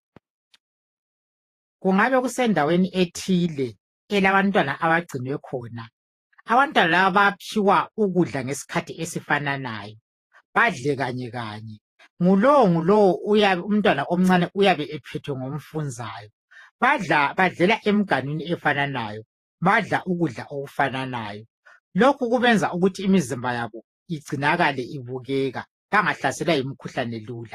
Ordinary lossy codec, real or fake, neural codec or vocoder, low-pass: AAC, 48 kbps; fake; vocoder, 48 kHz, 128 mel bands, Vocos; 14.4 kHz